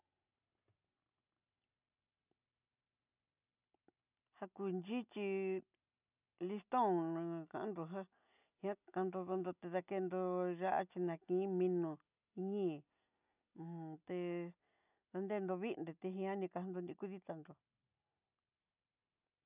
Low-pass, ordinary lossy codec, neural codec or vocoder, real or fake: 3.6 kHz; none; none; real